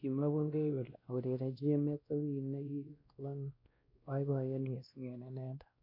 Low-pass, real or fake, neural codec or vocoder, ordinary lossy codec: 5.4 kHz; fake; codec, 16 kHz, 1 kbps, X-Codec, WavLM features, trained on Multilingual LibriSpeech; MP3, 32 kbps